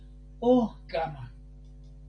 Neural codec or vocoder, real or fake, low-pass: none; real; 9.9 kHz